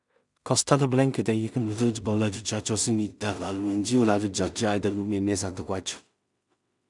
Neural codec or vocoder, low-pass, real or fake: codec, 16 kHz in and 24 kHz out, 0.4 kbps, LongCat-Audio-Codec, two codebook decoder; 10.8 kHz; fake